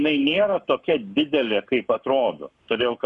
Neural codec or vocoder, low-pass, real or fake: codec, 44.1 kHz, 7.8 kbps, Pupu-Codec; 10.8 kHz; fake